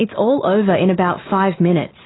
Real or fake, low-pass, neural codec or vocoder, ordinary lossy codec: real; 7.2 kHz; none; AAC, 16 kbps